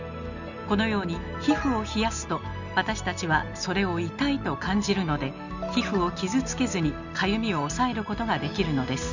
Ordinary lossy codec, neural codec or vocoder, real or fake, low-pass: none; none; real; 7.2 kHz